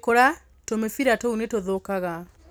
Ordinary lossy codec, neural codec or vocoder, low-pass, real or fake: none; none; none; real